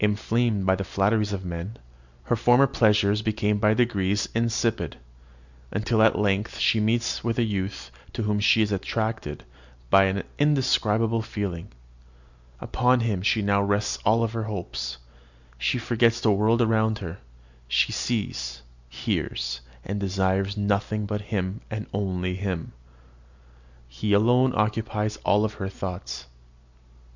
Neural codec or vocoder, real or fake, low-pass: none; real; 7.2 kHz